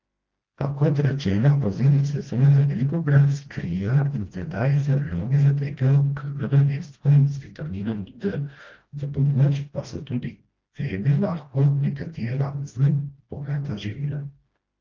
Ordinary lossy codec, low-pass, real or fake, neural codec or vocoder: Opus, 24 kbps; 7.2 kHz; fake; codec, 16 kHz, 1 kbps, FreqCodec, smaller model